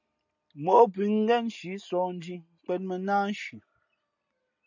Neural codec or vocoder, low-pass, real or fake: none; 7.2 kHz; real